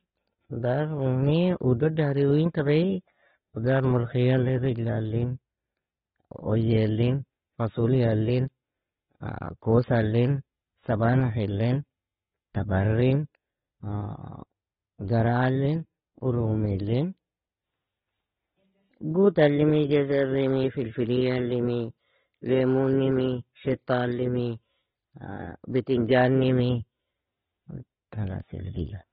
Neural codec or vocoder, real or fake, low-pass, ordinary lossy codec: none; real; 19.8 kHz; AAC, 16 kbps